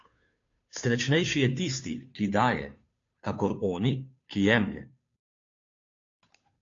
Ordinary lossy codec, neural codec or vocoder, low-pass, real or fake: AAC, 48 kbps; codec, 16 kHz, 2 kbps, FunCodec, trained on Chinese and English, 25 frames a second; 7.2 kHz; fake